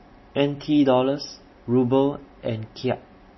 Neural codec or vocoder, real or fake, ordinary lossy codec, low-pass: none; real; MP3, 24 kbps; 7.2 kHz